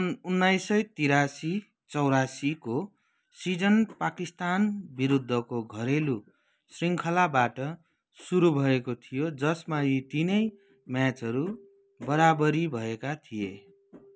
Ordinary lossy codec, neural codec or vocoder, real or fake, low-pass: none; none; real; none